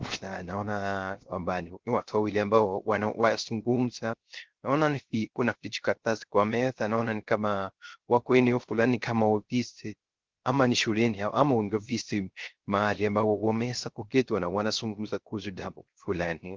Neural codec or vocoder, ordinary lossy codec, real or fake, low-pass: codec, 16 kHz, 0.3 kbps, FocalCodec; Opus, 16 kbps; fake; 7.2 kHz